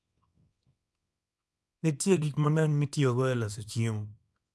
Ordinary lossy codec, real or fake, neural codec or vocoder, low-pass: none; fake; codec, 24 kHz, 0.9 kbps, WavTokenizer, small release; none